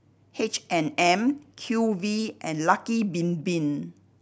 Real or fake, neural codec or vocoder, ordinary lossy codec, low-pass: real; none; none; none